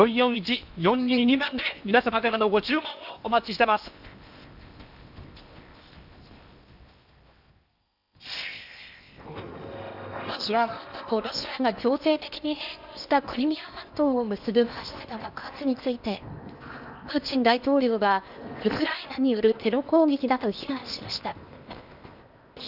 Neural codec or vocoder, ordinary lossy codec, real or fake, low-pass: codec, 16 kHz in and 24 kHz out, 0.8 kbps, FocalCodec, streaming, 65536 codes; none; fake; 5.4 kHz